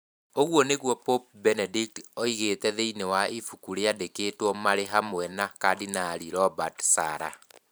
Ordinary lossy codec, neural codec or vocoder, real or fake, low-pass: none; vocoder, 44.1 kHz, 128 mel bands every 512 samples, BigVGAN v2; fake; none